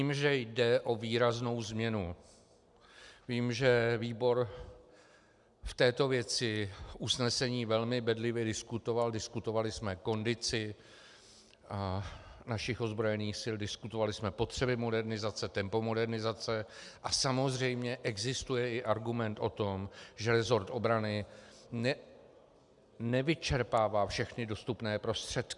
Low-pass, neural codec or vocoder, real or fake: 10.8 kHz; none; real